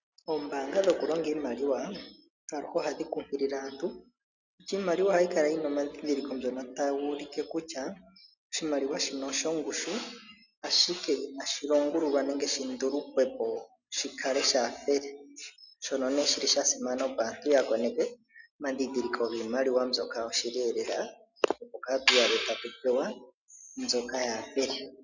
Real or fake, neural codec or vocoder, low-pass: real; none; 7.2 kHz